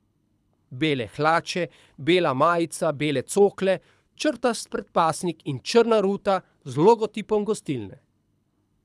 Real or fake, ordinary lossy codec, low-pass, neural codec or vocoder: fake; none; none; codec, 24 kHz, 6 kbps, HILCodec